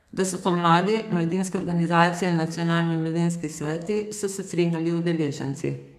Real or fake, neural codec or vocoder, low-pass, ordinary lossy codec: fake; codec, 32 kHz, 1.9 kbps, SNAC; 14.4 kHz; none